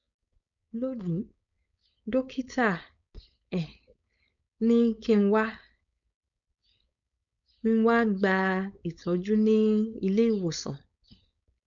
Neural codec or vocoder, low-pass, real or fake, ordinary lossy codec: codec, 16 kHz, 4.8 kbps, FACodec; 7.2 kHz; fake; none